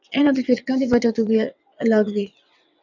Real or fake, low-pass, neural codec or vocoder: fake; 7.2 kHz; vocoder, 22.05 kHz, 80 mel bands, WaveNeXt